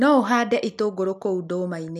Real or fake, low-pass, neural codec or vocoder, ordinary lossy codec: real; 14.4 kHz; none; none